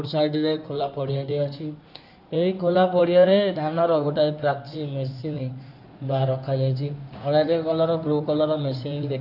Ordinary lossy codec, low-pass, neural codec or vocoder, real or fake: none; 5.4 kHz; codec, 16 kHz in and 24 kHz out, 2.2 kbps, FireRedTTS-2 codec; fake